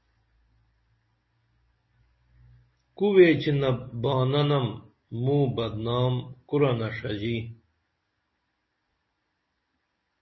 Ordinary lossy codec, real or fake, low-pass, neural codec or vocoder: MP3, 24 kbps; real; 7.2 kHz; none